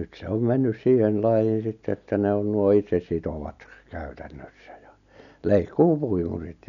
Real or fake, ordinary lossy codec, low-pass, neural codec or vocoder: real; none; 7.2 kHz; none